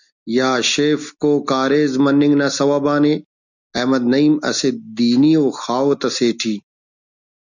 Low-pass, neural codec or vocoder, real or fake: 7.2 kHz; none; real